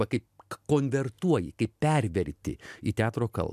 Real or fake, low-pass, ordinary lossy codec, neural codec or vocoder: real; 14.4 kHz; MP3, 96 kbps; none